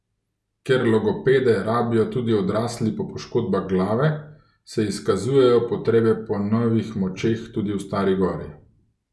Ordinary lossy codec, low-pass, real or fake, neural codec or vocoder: none; none; real; none